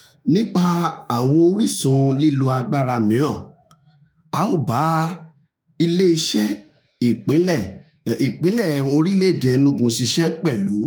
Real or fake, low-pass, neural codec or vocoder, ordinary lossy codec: fake; none; autoencoder, 48 kHz, 32 numbers a frame, DAC-VAE, trained on Japanese speech; none